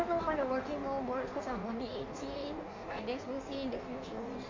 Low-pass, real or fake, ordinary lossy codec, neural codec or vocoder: 7.2 kHz; fake; MP3, 64 kbps; codec, 16 kHz in and 24 kHz out, 1.1 kbps, FireRedTTS-2 codec